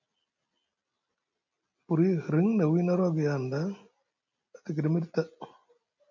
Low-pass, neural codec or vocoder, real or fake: 7.2 kHz; none; real